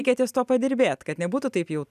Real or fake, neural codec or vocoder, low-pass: real; none; 14.4 kHz